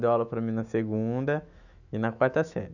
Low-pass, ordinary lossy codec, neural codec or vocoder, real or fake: 7.2 kHz; none; autoencoder, 48 kHz, 128 numbers a frame, DAC-VAE, trained on Japanese speech; fake